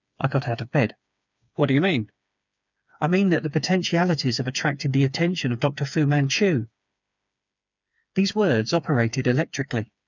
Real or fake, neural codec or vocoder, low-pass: fake; codec, 16 kHz, 4 kbps, FreqCodec, smaller model; 7.2 kHz